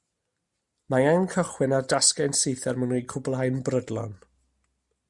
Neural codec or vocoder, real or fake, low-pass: none; real; 10.8 kHz